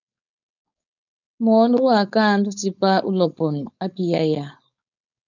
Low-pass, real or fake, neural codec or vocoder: 7.2 kHz; fake; codec, 16 kHz, 4.8 kbps, FACodec